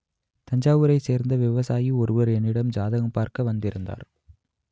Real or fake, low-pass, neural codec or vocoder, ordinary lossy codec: real; none; none; none